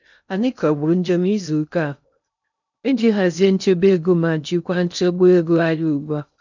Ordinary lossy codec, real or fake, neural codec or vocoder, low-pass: none; fake; codec, 16 kHz in and 24 kHz out, 0.6 kbps, FocalCodec, streaming, 2048 codes; 7.2 kHz